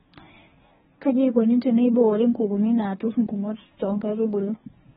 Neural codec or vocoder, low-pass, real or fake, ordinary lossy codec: codec, 24 kHz, 1 kbps, SNAC; 10.8 kHz; fake; AAC, 16 kbps